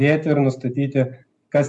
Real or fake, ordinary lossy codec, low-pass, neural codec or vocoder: real; AAC, 64 kbps; 10.8 kHz; none